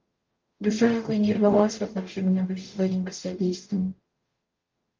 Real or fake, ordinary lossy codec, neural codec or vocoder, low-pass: fake; Opus, 32 kbps; codec, 44.1 kHz, 0.9 kbps, DAC; 7.2 kHz